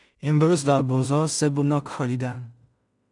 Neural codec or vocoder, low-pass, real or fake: codec, 16 kHz in and 24 kHz out, 0.4 kbps, LongCat-Audio-Codec, two codebook decoder; 10.8 kHz; fake